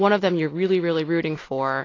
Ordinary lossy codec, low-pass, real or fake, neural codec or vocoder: AAC, 32 kbps; 7.2 kHz; real; none